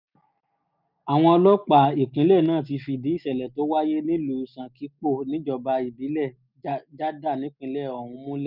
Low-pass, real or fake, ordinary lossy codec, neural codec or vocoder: 5.4 kHz; real; none; none